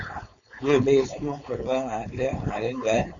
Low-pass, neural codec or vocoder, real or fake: 7.2 kHz; codec, 16 kHz, 4.8 kbps, FACodec; fake